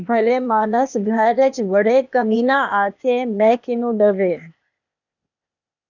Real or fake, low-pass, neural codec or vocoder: fake; 7.2 kHz; codec, 16 kHz, 0.8 kbps, ZipCodec